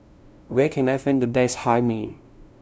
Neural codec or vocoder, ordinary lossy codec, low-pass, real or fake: codec, 16 kHz, 0.5 kbps, FunCodec, trained on LibriTTS, 25 frames a second; none; none; fake